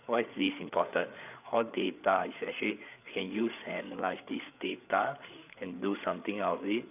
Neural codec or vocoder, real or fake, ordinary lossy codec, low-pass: codec, 16 kHz, 8 kbps, FreqCodec, larger model; fake; none; 3.6 kHz